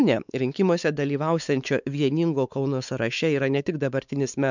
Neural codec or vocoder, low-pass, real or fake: codec, 16 kHz, 4 kbps, X-Codec, WavLM features, trained on Multilingual LibriSpeech; 7.2 kHz; fake